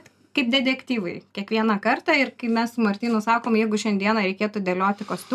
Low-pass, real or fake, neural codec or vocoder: 14.4 kHz; real; none